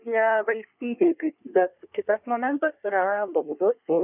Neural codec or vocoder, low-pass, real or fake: codec, 24 kHz, 1 kbps, SNAC; 3.6 kHz; fake